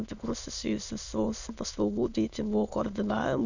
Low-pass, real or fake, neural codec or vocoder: 7.2 kHz; fake; autoencoder, 22.05 kHz, a latent of 192 numbers a frame, VITS, trained on many speakers